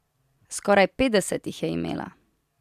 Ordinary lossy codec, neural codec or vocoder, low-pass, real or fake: MP3, 96 kbps; none; 14.4 kHz; real